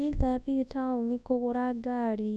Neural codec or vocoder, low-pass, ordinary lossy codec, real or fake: codec, 24 kHz, 0.9 kbps, WavTokenizer, large speech release; none; none; fake